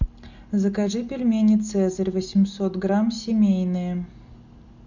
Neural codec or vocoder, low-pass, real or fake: none; 7.2 kHz; real